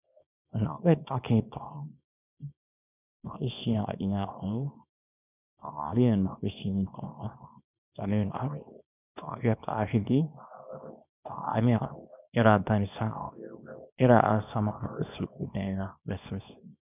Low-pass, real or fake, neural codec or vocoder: 3.6 kHz; fake; codec, 24 kHz, 0.9 kbps, WavTokenizer, small release